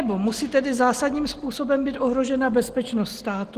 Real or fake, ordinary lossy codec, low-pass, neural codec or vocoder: real; Opus, 16 kbps; 14.4 kHz; none